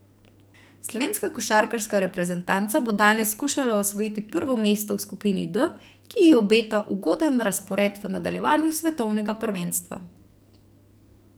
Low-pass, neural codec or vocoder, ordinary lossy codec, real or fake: none; codec, 44.1 kHz, 2.6 kbps, SNAC; none; fake